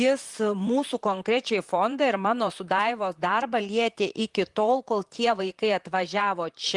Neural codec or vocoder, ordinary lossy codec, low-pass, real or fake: vocoder, 44.1 kHz, 128 mel bands, Pupu-Vocoder; Opus, 24 kbps; 10.8 kHz; fake